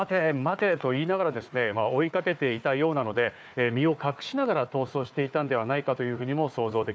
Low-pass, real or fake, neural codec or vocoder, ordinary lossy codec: none; fake; codec, 16 kHz, 4 kbps, FunCodec, trained on Chinese and English, 50 frames a second; none